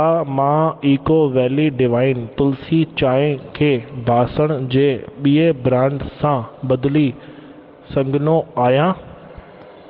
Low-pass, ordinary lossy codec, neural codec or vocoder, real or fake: 5.4 kHz; Opus, 16 kbps; none; real